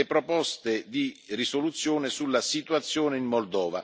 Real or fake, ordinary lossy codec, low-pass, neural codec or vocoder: real; none; none; none